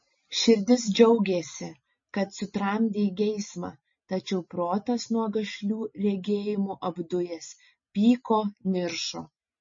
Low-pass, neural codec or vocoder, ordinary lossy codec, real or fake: 7.2 kHz; none; MP3, 32 kbps; real